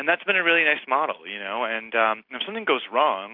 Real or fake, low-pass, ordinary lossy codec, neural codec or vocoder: real; 5.4 kHz; AAC, 48 kbps; none